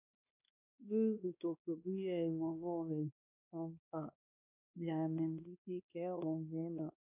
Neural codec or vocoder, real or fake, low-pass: codec, 16 kHz, 2 kbps, X-Codec, WavLM features, trained on Multilingual LibriSpeech; fake; 3.6 kHz